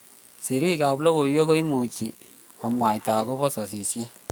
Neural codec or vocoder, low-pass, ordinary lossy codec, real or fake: codec, 44.1 kHz, 2.6 kbps, SNAC; none; none; fake